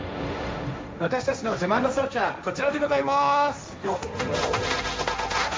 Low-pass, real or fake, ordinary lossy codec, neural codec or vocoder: none; fake; none; codec, 16 kHz, 1.1 kbps, Voila-Tokenizer